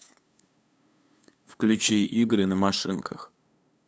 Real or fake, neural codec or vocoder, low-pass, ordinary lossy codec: fake; codec, 16 kHz, 2 kbps, FunCodec, trained on LibriTTS, 25 frames a second; none; none